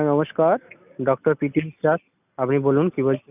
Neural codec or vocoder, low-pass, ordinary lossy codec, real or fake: none; 3.6 kHz; none; real